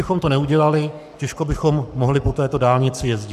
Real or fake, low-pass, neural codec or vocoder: fake; 14.4 kHz; codec, 44.1 kHz, 7.8 kbps, Pupu-Codec